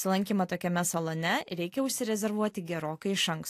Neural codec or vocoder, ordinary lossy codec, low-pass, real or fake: none; AAC, 64 kbps; 14.4 kHz; real